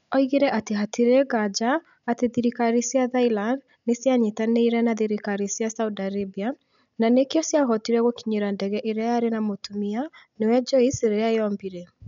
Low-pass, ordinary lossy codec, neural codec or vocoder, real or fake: 7.2 kHz; none; none; real